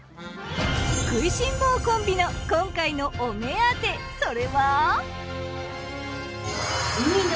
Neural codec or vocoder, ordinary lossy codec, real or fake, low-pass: none; none; real; none